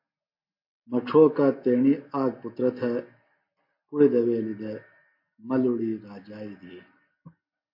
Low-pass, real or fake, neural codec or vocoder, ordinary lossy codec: 5.4 kHz; real; none; MP3, 32 kbps